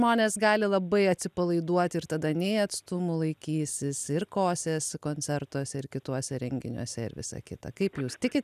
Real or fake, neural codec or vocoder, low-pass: real; none; 14.4 kHz